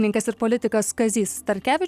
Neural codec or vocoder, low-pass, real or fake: none; 14.4 kHz; real